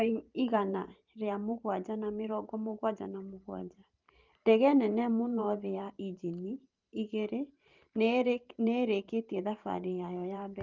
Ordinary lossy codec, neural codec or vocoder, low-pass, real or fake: Opus, 32 kbps; vocoder, 44.1 kHz, 128 mel bands every 512 samples, BigVGAN v2; 7.2 kHz; fake